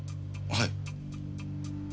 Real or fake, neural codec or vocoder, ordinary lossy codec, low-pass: real; none; none; none